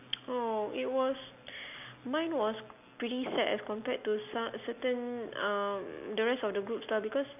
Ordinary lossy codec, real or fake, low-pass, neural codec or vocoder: none; real; 3.6 kHz; none